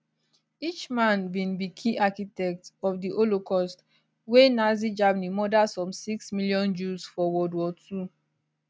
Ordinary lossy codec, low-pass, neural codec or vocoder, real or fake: none; none; none; real